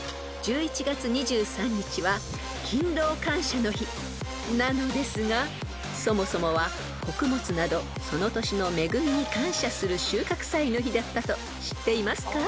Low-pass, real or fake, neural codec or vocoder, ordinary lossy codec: none; real; none; none